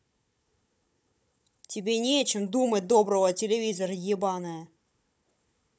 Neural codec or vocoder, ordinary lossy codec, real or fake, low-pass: codec, 16 kHz, 16 kbps, FunCodec, trained on Chinese and English, 50 frames a second; none; fake; none